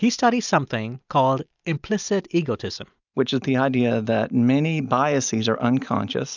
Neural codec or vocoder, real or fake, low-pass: none; real; 7.2 kHz